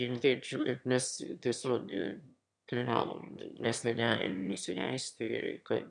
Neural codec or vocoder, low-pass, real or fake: autoencoder, 22.05 kHz, a latent of 192 numbers a frame, VITS, trained on one speaker; 9.9 kHz; fake